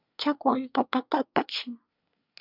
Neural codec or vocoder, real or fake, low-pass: codec, 24 kHz, 1 kbps, SNAC; fake; 5.4 kHz